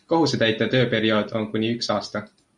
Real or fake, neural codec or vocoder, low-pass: real; none; 10.8 kHz